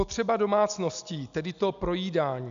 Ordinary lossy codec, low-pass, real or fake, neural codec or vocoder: AAC, 96 kbps; 7.2 kHz; real; none